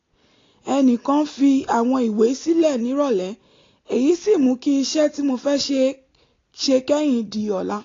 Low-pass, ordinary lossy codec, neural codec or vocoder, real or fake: 7.2 kHz; AAC, 32 kbps; none; real